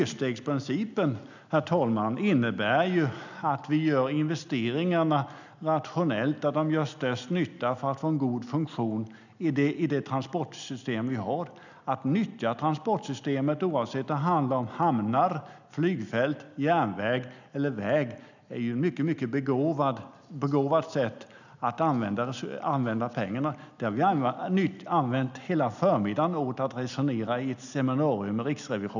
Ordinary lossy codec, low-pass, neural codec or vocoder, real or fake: none; 7.2 kHz; none; real